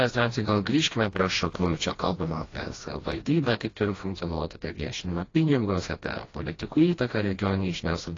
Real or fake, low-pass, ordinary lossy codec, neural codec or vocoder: fake; 7.2 kHz; AAC, 32 kbps; codec, 16 kHz, 1 kbps, FreqCodec, smaller model